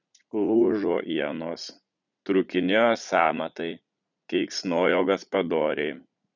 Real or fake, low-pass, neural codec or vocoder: fake; 7.2 kHz; vocoder, 44.1 kHz, 80 mel bands, Vocos